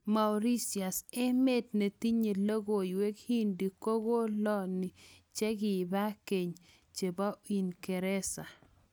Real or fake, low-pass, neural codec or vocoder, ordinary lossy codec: real; none; none; none